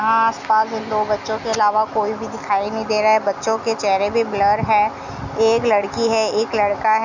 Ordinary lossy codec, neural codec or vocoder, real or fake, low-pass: none; none; real; 7.2 kHz